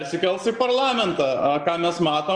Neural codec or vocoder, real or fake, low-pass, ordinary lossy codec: none; real; 9.9 kHz; Opus, 32 kbps